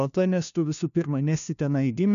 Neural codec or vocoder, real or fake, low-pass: codec, 16 kHz, 1 kbps, FunCodec, trained on LibriTTS, 50 frames a second; fake; 7.2 kHz